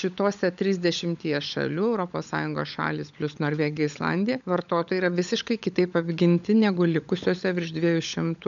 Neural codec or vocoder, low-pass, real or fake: codec, 16 kHz, 16 kbps, FunCodec, trained on LibriTTS, 50 frames a second; 7.2 kHz; fake